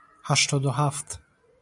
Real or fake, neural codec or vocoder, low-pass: real; none; 10.8 kHz